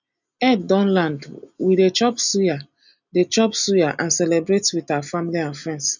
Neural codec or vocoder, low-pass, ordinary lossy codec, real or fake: none; 7.2 kHz; none; real